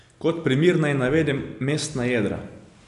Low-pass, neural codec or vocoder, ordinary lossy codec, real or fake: 10.8 kHz; none; none; real